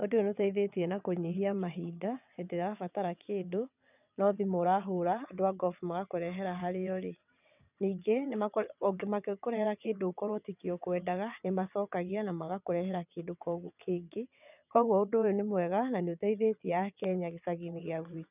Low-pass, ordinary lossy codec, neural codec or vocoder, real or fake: 3.6 kHz; none; vocoder, 22.05 kHz, 80 mel bands, WaveNeXt; fake